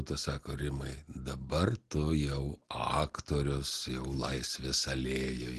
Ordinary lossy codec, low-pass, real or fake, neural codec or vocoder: Opus, 16 kbps; 10.8 kHz; real; none